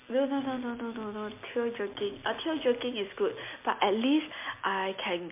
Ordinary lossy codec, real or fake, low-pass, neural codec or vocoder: MP3, 24 kbps; real; 3.6 kHz; none